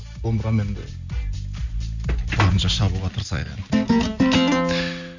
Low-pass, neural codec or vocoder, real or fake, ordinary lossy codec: 7.2 kHz; none; real; none